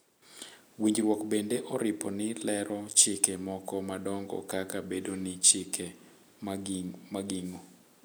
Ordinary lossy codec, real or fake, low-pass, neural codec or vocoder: none; real; none; none